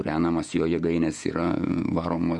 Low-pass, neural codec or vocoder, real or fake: 10.8 kHz; vocoder, 48 kHz, 128 mel bands, Vocos; fake